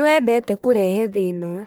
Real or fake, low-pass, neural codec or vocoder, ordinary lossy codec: fake; none; codec, 44.1 kHz, 1.7 kbps, Pupu-Codec; none